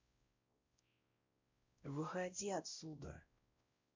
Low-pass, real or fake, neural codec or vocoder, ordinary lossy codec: 7.2 kHz; fake; codec, 16 kHz, 0.5 kbps, X-Codec, WavLM features, trained on Multilingual LibriSpeech; MP3, 64 kbps